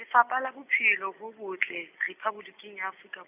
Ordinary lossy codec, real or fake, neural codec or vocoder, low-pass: none; real; none; 3.6 kHz